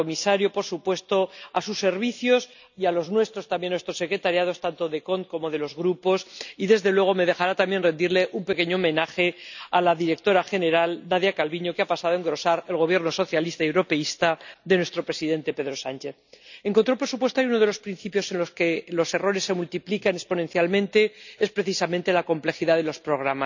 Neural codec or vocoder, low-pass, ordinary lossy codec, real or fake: none; 7.2 kHz; none; real